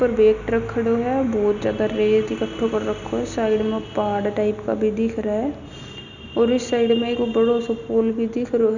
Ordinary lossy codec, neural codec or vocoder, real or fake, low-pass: none; none; real; 7.2 kHz